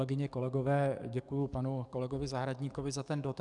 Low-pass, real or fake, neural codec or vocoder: 10.8 kHz; fake; codec, 44.1 kHz, 7.8 kbps, DAC